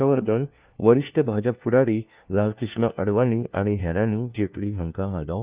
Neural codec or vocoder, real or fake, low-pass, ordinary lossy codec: codec, 16 kHz, 1 kbps, FunCodec, trained on LibriTTS, 50 frames a second; fake; 3.6 kHz; Opus, 24 kbps